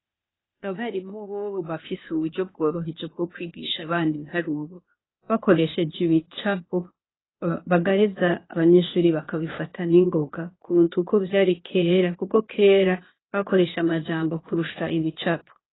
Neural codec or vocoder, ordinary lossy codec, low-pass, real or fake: codec, 16 kHz, 0.8 kbps, ZipCodec; AAC, 16 kbps; 7.2 kHz; fake